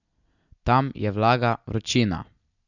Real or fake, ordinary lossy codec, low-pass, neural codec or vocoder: real; none; 7.2 kHz; none